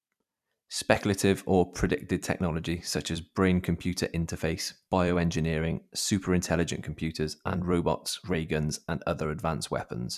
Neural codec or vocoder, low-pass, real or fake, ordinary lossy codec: vocoder, 44.1 kHz, 128 mel bands every 256 samples, BigVGAN v2; 14.4 kHz; fake; none